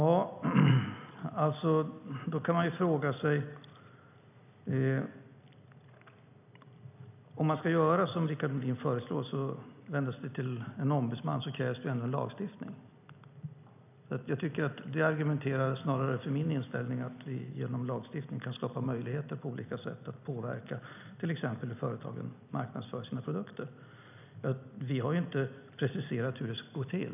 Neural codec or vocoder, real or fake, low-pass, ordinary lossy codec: none; real; 3.6 kHz; none